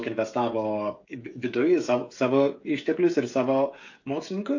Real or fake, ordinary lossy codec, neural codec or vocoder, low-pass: fake; AAC, 48 kbps; codec, 16 kHz, 16 kbps, FreqCodec, smaller model; 7.2 kHz